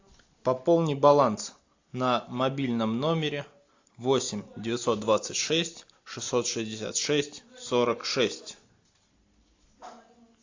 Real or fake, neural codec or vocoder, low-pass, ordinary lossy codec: real; none; 7.2 kHz; AAC, 48 kbps